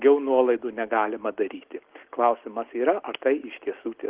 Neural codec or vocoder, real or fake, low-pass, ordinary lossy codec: none; real; 3.6 kHz; Opus, 16 kbps